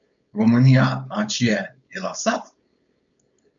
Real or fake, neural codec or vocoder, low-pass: fake; codec, 16 kHz, 4.8 kbps, FACodec; 7.2 kHz